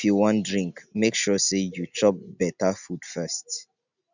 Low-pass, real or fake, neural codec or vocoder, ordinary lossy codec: 7.2 kHz; real; none; none